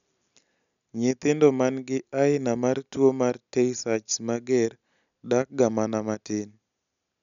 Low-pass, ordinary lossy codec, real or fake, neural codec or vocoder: 7.2 kHz; none; real; none